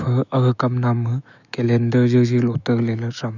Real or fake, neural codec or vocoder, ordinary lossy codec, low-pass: fake; autoencoder, 48 kHz, 128 numbers a frame, DAC-VAE, trained on Japanese speech; none; 7.2 kHz